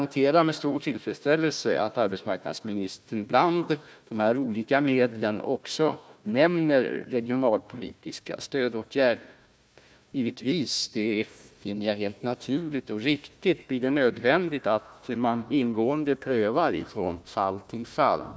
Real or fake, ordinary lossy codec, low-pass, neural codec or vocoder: fake; none; none; codec, 16 kHz, 1 kbps, FunCodec, trained on Chinese and English, 50 frames a second